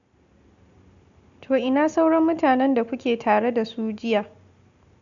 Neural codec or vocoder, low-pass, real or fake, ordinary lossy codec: none; 7.2 kHz; real; none